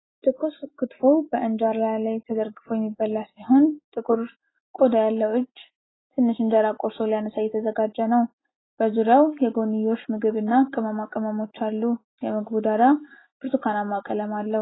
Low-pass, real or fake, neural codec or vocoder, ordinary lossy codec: 7.2 kHz; real; none; AAC, 16 kbps